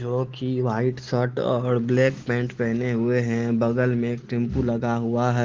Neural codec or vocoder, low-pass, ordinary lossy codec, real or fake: none; 7.2 kHz; Opus, 16 kbps; real